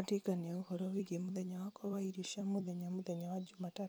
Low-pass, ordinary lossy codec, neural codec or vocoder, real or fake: none; none; vocoder, 44.1 kHz, 128 mel bands every 256 samples, BigVGAN v2; fake